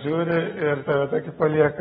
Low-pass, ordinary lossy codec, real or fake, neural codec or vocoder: 19.8 kHz; AAC, 16 kbps; real; none